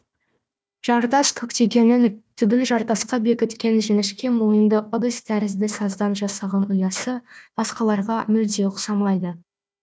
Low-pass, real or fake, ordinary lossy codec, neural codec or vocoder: none; fake; none; codec, 16 kHz, 1 kbps, FunCodec, trained on Chinese and English, 50 frames a second